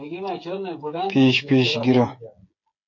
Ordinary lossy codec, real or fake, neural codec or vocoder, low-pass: MP3, 48 kbps; fake; codec, 16 kHz, 8 kbps, FreqCodec, smaller model; 7.2 kHz